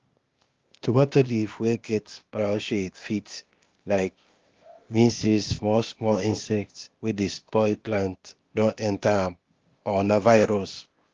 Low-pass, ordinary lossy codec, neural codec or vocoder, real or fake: 7.2 kHz; Opus, 32 kbps; codec, 16 kHz, 0.8 kbps, ZipCodec; fake